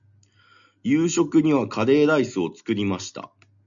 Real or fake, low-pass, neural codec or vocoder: real; 7.2 kHz; none